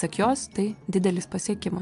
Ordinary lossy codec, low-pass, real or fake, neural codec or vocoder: AAC, 64 kbps; 10.8 kHz; real; none